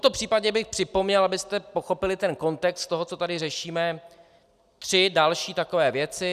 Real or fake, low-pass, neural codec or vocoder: real; 14.4 kHz; none